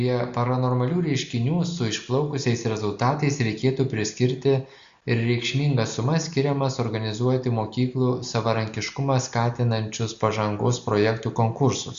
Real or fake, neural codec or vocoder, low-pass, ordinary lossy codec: real; none; 7.2 kHz; Opus, 64 kbps